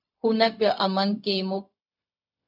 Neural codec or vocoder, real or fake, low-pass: codec, 16 kHz, 0.4 kbps, LongCat-Audio-Codec; fake; 5.4 kHz